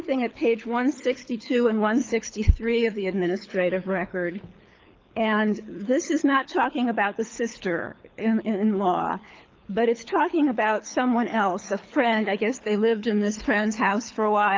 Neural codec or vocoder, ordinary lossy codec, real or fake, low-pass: codec, 24 kHz, 6 kbps, HILCodec; Opus, 32 kbps; fake; 7.2 kHz